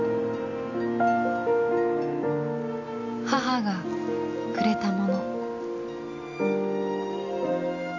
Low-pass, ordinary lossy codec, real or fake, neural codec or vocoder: 7.2 kHz; AAC, 48 kbps; real; none